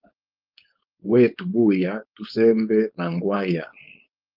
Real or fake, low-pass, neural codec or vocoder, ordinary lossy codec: fake; 5.4 kHz; codec, 16 kHz, 4.8 kbps, FACodec; Opus, 24 kbps